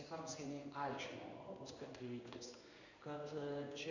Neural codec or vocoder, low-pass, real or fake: codec, 16 kHz in and 24 kHz out, 1 kbps, XY-Tokenizer; 7.2 kHz; fake